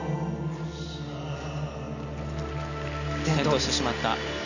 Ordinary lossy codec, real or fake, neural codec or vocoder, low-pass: none; real; none; 7.2 kHz